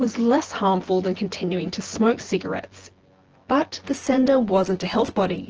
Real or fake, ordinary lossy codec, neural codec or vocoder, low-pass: fake; Opus, 16 kbps; vocoder, 24 kHz, 100 mel bands, Vocos; 7.2 kHz